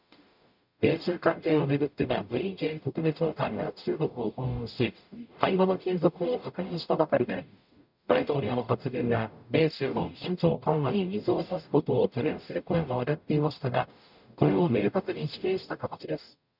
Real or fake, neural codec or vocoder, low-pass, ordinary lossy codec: fake; codec, 44.1 kHz, 0.9 kbps, DAC; 5.4 kHz; none